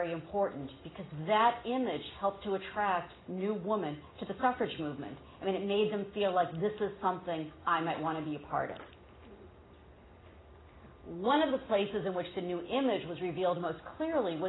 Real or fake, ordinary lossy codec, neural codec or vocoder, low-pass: real; AAC, 16 kbps; none; 7.2 kHz